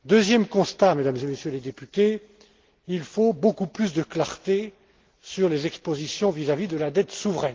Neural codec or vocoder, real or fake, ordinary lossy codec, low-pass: none; real; Opus, 16 kbps; 7.2 kHz